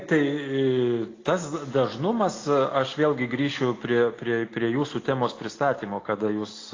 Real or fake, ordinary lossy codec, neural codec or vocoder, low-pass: real; AAC, 32 kbps; none; 7.2 kHz